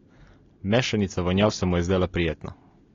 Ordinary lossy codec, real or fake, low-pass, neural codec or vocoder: AAC, 32 kbps; real; 7.2 kHz; none